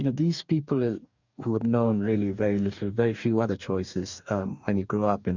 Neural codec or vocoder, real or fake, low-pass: codec, 44.1 kHz, 2.6 kbps, DAC; fake; 7.2 kHz